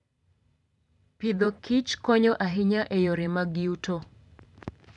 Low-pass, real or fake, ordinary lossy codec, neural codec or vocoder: 10.8 kHz; fake; none; vocoder, 44.1 kHz, 128 mel bands every 512 samples, BigVGAN v2